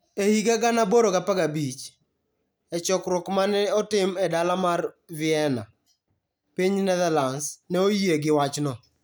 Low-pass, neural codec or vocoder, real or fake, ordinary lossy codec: none; none; real; none